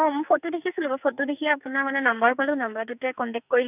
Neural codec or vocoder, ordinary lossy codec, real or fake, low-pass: codec, 44.1 kHz, 2.6 kbps, SNAC; none; fake; 3.6 kHz